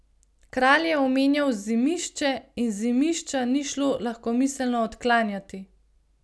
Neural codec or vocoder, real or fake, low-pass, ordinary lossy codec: none; real; none; none